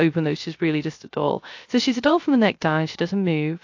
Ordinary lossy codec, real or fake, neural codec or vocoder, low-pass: AAC, 48 kbps; fake; codec, 16 kHz, 0.3 kbps, FocalCodec; 7.2 kHz